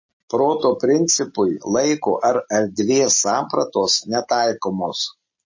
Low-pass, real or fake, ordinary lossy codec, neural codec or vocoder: 7.2 kHz; real; MP3, 32 kbps; none